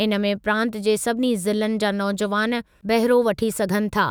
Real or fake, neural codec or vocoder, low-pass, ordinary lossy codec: fake; autoencoder, 48 kHz, 128 numbers a frame, DAC-VAE, trained on Japanese speech; none; none